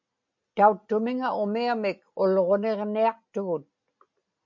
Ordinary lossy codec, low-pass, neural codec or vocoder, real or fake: MP3, 48 kbps; 7.2 kHz; none; real